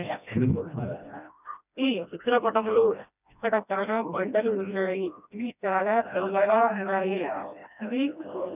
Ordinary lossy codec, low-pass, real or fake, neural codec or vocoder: none; 3.6 kHz; fake; codec, 16 kHz, 1 kbps, FreqCodec, smaller model